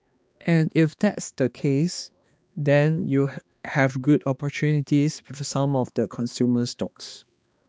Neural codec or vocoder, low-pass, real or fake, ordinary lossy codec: codec, 16 kHz, 2 kbps, X-Codec, HuBERT features, trained on balanced general audio; none; fake; none